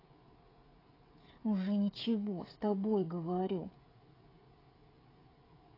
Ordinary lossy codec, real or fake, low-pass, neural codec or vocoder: AAC, 24 kbps; fake; 5.4 kHz; codec, 16 kHz, 16 kbps, FreqCodec, smaller model